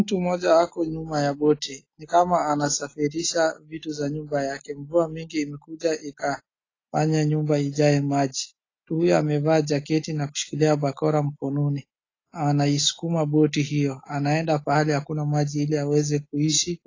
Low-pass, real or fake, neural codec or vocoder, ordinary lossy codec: 7.2 kHz; real; none; AAC, 32 kbps